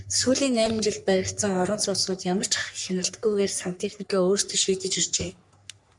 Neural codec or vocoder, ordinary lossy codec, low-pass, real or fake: codec, 44.1 kHz, 3.4 kbps, Pupu-Codec; MP3, 96 kbps; 10.8 kHz; fake